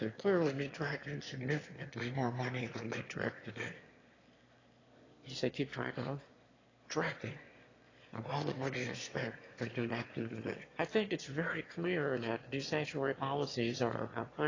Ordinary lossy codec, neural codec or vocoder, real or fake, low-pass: AAC, 32 kbps; autoencoder, 22.05 kHz, a latent of 192 numbers a frame, VITS, trained on one speaker; fake; 7.2 kHz